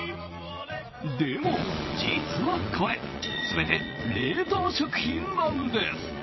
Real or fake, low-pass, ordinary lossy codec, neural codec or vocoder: fake; 7.2 kHz; MP3, 24 kbps; vocoder, 22.05 kHz, 80 mel bands, Vocos